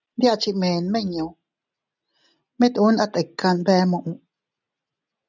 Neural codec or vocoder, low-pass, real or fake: none; 7.2 kHz; real